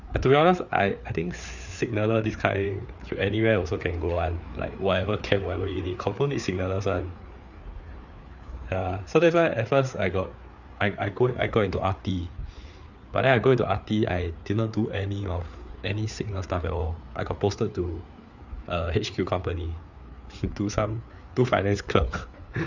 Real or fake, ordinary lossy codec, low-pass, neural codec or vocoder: fake; none; 7.2 kHz; codec, 16 kHz, 4 kbps, FreqCodec, larger model